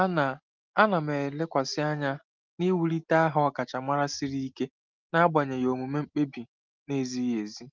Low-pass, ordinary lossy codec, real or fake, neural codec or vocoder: 7.2 kHz; Opus, 32 kbps; real; none